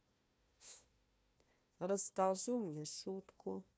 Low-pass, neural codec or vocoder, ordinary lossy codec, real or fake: none; codec, 16 kHz, 1 kbps, FunCodec, trained on Chinese and English, 50 frames a second; none; fake